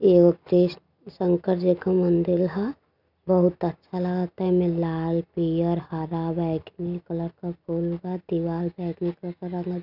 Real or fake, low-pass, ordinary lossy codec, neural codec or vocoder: real; 5.4 kHz; none; none